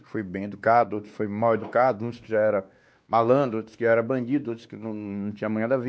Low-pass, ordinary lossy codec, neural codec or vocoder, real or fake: none; none; codec, 16 kHz, 2 kbps, X-Codec, WavLM features, trained on Multilingual LibriSpeech; fake